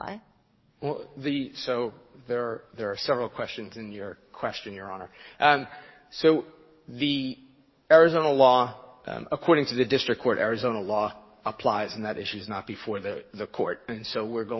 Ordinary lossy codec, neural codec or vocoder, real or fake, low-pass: MP3, 24 kbps; codec, 44.1 kHz, 7.8 kbps, DAC; fake; 7.2 kHz